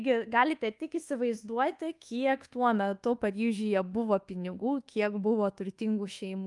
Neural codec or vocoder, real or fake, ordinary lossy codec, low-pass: codec, 24 kHz, 1.2 kbps, DualCodec; fake; Opus, 32 kbps; 10.8 kHz